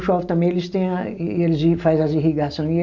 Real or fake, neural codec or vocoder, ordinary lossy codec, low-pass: real; none; none; 7.2 kHz